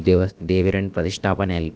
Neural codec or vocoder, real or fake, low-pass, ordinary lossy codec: codec, 16 kHz, about 1 kbps, DyCAST, with the encoder's durations; fake; none; none